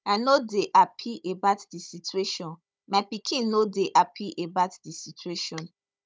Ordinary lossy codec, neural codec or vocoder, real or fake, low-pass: none; codec, 16 kHz, 16 kbps, FunCodec, trained on Chinese and English, 50 frames a second; fake; none